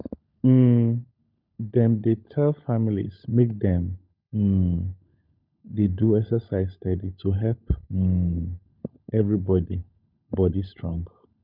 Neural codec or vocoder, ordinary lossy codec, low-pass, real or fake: codec, 16 kHz, 16 kbps, FunCodec, trained on LibriTTS, 50 frames a second; none; 5.4 kHz; fake